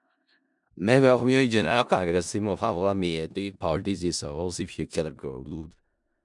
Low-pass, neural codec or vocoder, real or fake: 10.8 kHz; codec, 16 kHz in and 24 kHz out, 0.4 kbps, LongCat-Audio-Codec, four codebook decoder; fake